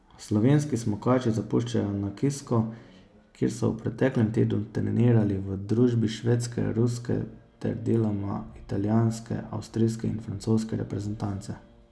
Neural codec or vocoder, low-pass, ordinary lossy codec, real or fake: none; none; none; real